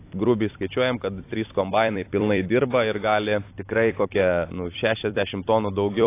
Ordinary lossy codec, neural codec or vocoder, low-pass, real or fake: AAC, 24 kbps; vocoder, 44.1 kHz, 128 mel bands every 256 samples, BigVGAN v2; 3.6 kHz; fake